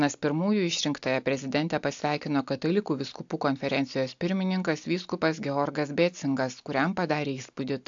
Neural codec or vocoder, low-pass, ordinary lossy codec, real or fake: none; 7.2 kHz; AAC, 64 kbps; real